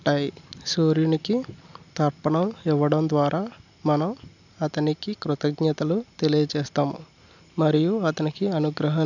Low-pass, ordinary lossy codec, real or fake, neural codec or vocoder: 7.2 kHz; none; real; none